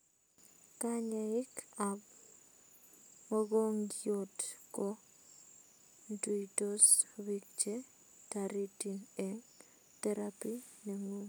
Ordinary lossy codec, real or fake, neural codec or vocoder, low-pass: none; real; none; none